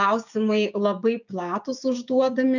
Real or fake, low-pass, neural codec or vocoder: fake; 7.2 kHz; codec, 16 kHz, 6 kbps, DAC